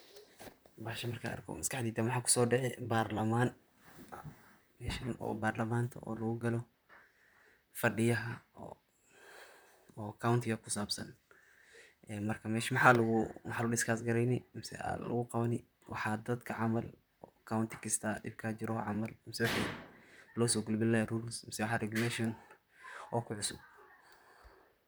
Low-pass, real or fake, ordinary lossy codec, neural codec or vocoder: none; fake; none; vocoder, 44.1 kHz, 128 mel bands, Pupu-Vocoder